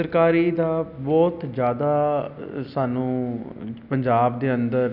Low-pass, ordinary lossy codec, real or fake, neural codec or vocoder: 5.4 kHz; none; real; none